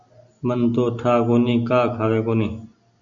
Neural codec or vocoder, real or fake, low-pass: none; real; 7.2 kHz